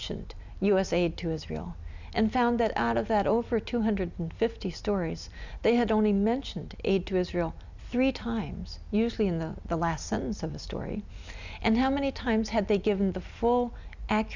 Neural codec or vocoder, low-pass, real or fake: none; 7.2 kHz; real